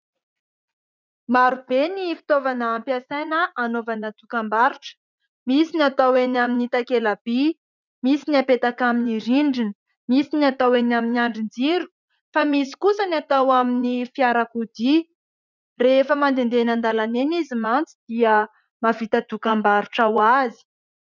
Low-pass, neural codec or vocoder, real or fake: 7.2 kHz; vocoder, 44.1 kHz, 80 mel bands, Vocos; fake